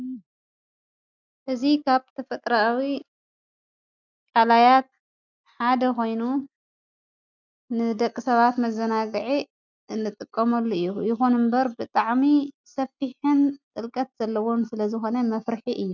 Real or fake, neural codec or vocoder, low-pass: real; none; 7.2 kHz